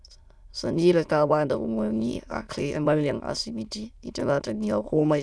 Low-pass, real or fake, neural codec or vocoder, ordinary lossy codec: none; fake; autoencoder, 22.05 kHz, a latent of 192 numbers a frame, VITS, trained on many speakers; none